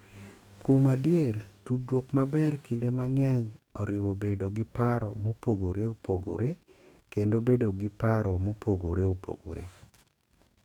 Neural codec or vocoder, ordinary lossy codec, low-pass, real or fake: codec, 44.1 kHz, 2.6 kbps, DAC; none; 19.8 kHz; fake